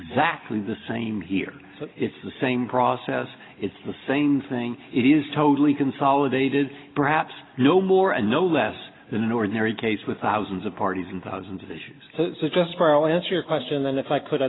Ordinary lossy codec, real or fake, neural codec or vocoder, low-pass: AAC, 16 kbps; real; none; 7.2 kHz